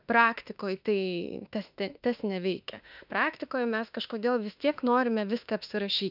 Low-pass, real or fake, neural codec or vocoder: 5.4 kHz; fake; autoencoder, 48 kHz, 32 numbers a frame, DAC-VAE, trained on Japanese speech